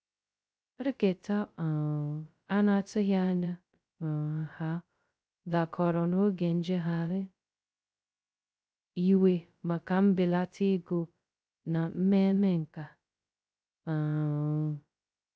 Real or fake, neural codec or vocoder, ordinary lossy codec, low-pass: fake; codec, 16 kHz, 0.2 kbps, FocalCodec; none; none